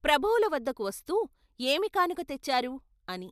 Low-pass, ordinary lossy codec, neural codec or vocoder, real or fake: 14.4 kHz; MP3, 96 kbps; none; real